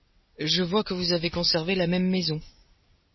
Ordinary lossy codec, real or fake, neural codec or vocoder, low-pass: MP3, 24 kbps; real; none; 7.2 kHz